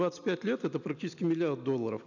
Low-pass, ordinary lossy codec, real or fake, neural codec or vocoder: 7.2 kHz; none; real; none